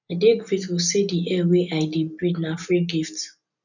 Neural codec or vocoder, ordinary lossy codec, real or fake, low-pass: none; none; real; 7.2 kHz